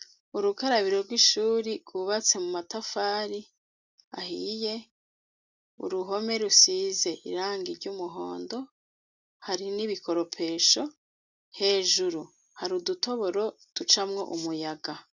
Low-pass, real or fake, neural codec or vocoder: 7.2 kHz; real; none